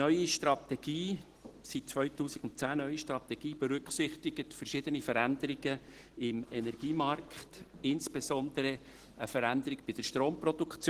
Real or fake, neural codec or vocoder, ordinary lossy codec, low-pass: real; none; Opus, 16 kbps; 14.4 kHz